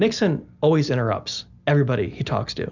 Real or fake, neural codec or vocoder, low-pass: real; none; 7.2 kHz